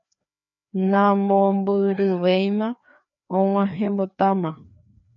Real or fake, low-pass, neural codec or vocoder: fake; 7.2 kHz; codec, 16 kHz, 2 kbps, FreqCodec, larger model